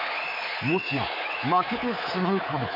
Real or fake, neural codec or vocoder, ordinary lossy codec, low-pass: fake; codec, 24 kHz, 3.1 kbps, DualCodec; none; 5.4 kHz